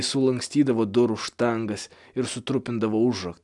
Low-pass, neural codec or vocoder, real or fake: 10.8 kHz; none; real